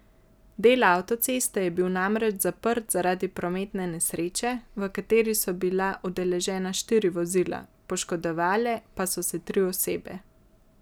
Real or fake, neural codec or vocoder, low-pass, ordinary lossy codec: real; none; none; none